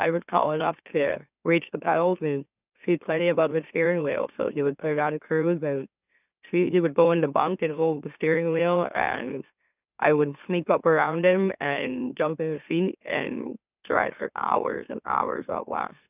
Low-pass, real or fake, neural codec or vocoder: 3.6 kHz; fake; autoencoder, 44.1 kHz, a latent of 192 numbers a frame, MeloTTS